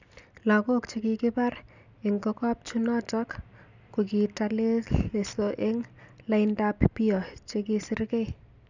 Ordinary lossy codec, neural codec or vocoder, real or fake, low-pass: none; none; real; 7.2 kHz